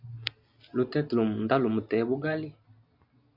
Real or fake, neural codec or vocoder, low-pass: real; none; 5.4 kHz